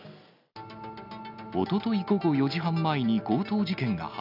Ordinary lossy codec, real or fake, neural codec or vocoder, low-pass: none; real; none; 5.4 kHz